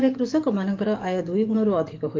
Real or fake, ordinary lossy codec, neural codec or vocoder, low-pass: fake; Opus, 32 kbps; codec, 44.1 kHz, 7.8 kbps, DAC; 7.2 kHz